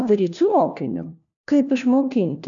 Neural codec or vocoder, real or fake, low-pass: codec, 16 kHz, 1 kbps, FunCodec, trained on LibriTTS, 50 frames a second; fake; 7.2 kHz